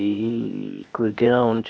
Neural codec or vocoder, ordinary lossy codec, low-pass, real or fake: codec, 16 kHz, 0.8 kbps, ZipCodec; none; none; fake